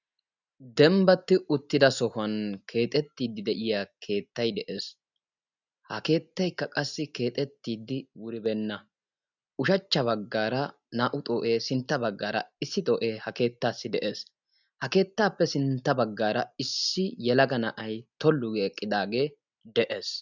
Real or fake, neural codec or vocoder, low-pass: real; none; 7.2 kHz